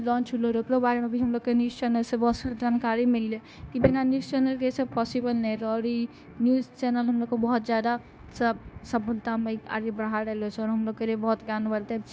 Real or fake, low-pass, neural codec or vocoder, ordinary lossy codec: fake; none; codec, 16 kHz, 0.9 kbps, LongCat-Audio-Codec; none